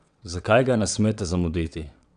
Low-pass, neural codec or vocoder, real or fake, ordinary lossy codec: 9.9 kHz; vocoder, 22.05 kHz, 80 mel bands, WaveNeXt; fake; none